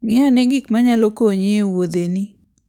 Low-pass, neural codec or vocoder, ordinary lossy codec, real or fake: 19.8 kHz; codec, 44.1 kHz, 7.8 kbps, DAC; none; fake